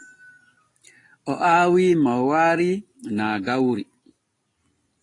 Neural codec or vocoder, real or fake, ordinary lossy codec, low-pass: none; real; AAC, 32 kbps; 10.8 kHz